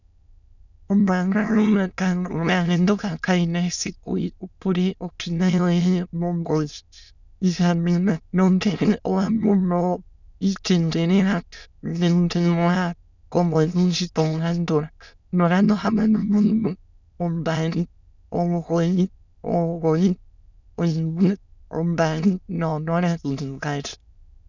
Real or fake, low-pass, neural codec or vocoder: fake; 7.2 kHz; autoencoder, 22.05 kHz, a latent of 192 numbers a frame, VITS, trained on many speakers